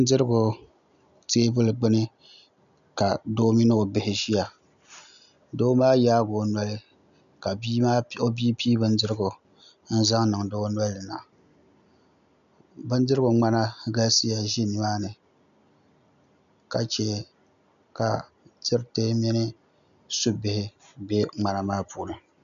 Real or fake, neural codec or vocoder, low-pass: real; none; 7.2 kHz